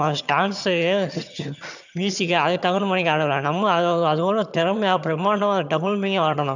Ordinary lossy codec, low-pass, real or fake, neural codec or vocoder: none; 7.2 kHz; fake; vocoder, 22.05 kHz, 80 mel bands, HiFi-GAN